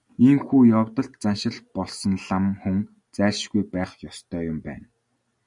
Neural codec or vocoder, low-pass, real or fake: none; 10.8 kHz; real